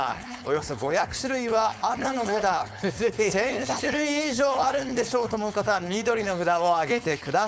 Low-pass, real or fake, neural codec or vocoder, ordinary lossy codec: none; fake; codec, 16 kHz, 4.8 kbps, FACodec; none